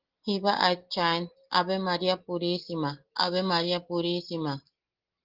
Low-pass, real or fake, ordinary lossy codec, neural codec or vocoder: 5.4 kHz; real; Opus, 16 kbps; none